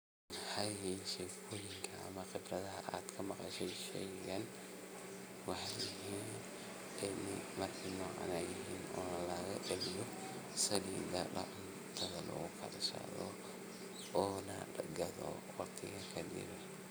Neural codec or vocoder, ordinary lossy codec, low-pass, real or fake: none; none; none; real